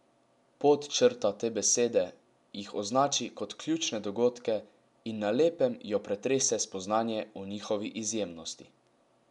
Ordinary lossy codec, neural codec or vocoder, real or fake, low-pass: none; none; real; 10.8 kHz